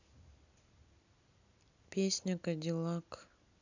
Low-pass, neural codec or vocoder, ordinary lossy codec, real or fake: 7.2 kHz; none; none; real